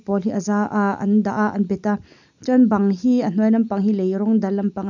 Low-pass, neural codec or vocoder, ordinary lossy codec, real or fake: 7.2 kHz; none; none; real